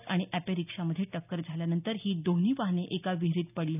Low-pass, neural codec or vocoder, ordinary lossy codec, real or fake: 3.6 kHz; none; none; real